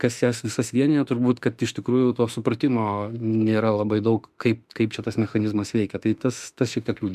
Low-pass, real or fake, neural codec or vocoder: 14.4 kHz; fake; autoencoder, 48 kHz, 32 numbers a frame, DAC-VAE, trained on Japanese speech